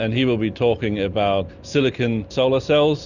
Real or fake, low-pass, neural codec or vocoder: real; 7.2 kHz; none